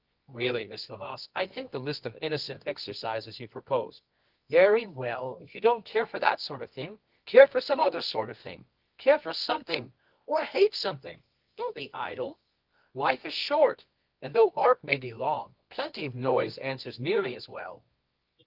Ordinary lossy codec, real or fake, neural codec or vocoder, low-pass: Opus, 32 kbps; fake; codec, 24 kHz, 0.9 kbps, WavTokenizer, medium music audio release; 5.4 kHz